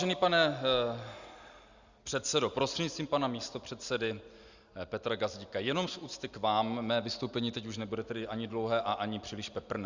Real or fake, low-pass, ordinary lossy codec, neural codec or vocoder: real; 7.2 kHz; Opus, 64 kbps; none